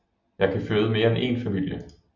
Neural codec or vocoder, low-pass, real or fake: none; 7.2 kHz; real